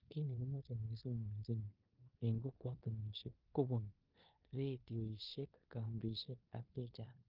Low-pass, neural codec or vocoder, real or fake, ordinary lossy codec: 5.4 kHz; codec, 16 kHz in and 24 kHz out, 0.9 kbps, LongCat-Audio-Codec, four codebook decoder; fake; Opus, 24 kbps